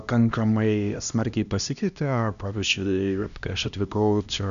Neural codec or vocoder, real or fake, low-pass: codec, 16 kHz, 1 kbps, X-Codec, HuBERT features, trained on LibriSpeech; fake; 7.2 kHz